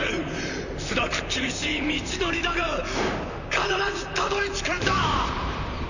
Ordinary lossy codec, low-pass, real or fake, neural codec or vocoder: none; 7.2 kHz; fake; vocoder, 44.1 kHz, 128 mel bands, Pupu-Vocoder